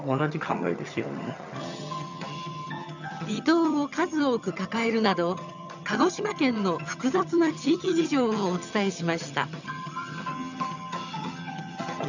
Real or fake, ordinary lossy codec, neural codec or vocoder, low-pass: fake; none; vocoder, 22.05 kHz, 80 mel bands, HiFi-GAN; 7.2 kHz